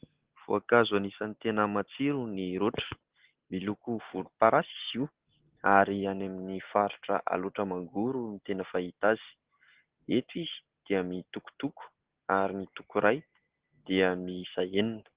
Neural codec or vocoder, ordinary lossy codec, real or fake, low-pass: none; Opus, 16 kbps; real; 3.6 kHz